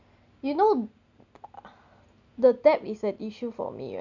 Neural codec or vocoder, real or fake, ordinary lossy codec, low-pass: none; real; none; 7.2 kHz